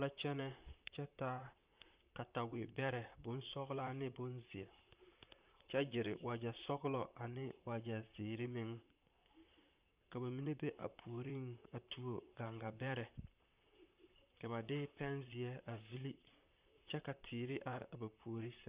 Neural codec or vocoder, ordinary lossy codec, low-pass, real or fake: vocoder, 44.1 kHz, 128 mel bands, Pupu-Vocoder; Opus, 64 kbps; 3.6 kHz; fake